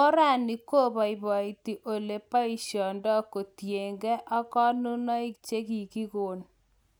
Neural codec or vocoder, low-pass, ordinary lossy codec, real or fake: none; none; none; real